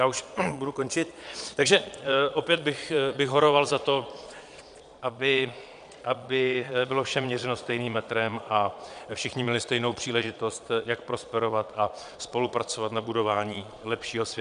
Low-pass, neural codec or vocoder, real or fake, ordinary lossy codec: 9.9 kHz; vocoder, 22.05 kHz, 80 mel bands, Vocos; fake; MP3, 96 kbps